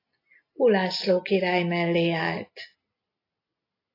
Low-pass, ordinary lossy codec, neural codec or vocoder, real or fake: 5.4 kHz; AAC, 32 kbps; none; real